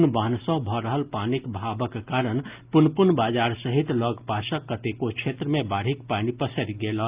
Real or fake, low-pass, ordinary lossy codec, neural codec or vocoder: real; 3.6 kHz; Opus, 32 kbps; none